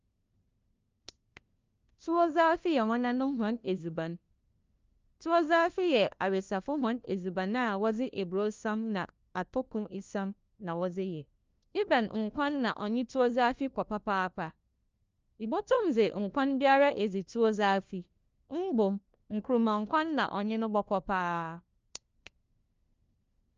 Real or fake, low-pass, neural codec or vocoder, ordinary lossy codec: fake; 7.2 kHz; codec, 16 kHz, 1 kbps, FunCodec, trained on LibriTTS, 50 frames a second; Opus, 24 kbps